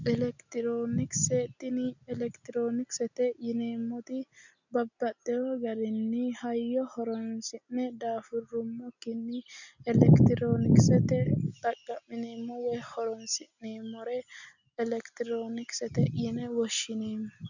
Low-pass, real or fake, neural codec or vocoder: 7.2 kHz; real; none